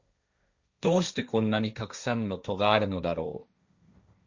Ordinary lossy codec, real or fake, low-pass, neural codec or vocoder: Opus, 64 kbps; fake; 7.2 kHz; codec, 16 kHz, 1.1 kbps, Voila-Tokenizer